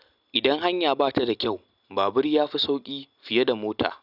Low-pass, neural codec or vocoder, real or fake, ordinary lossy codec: 5.4 kHz; none; real; none